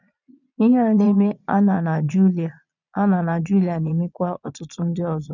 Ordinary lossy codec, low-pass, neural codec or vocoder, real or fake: none; 7.2 kHz; vocoder, 22.05 kHz, 80 mel bands, Vocos; fake